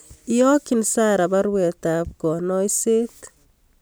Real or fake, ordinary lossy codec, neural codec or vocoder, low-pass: real; none; none; none